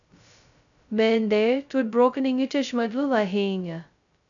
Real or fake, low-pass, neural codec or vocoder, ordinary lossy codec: fake; 7.2 kHz; codec, 16 kHz, 0.2 kbps, FocalCodec; none